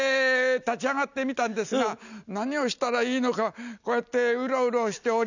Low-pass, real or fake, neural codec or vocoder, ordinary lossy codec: 7.2 kHz; real; none; none